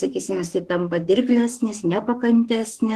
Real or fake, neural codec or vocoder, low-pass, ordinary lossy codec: fake; autoencoder, 48 kHz, 32 numbers a frame, DAC-VAE, trained on Japanese speech; 14.4 kHz; Opus, 16 kbps